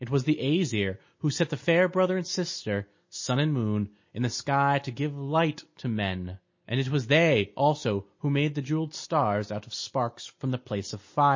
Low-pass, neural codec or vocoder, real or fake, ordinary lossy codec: 7.2 kHz; none; real; MP3, 32 kbps